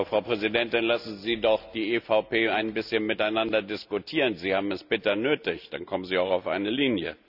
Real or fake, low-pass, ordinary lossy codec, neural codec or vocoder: real; 5.4 kHz; none; none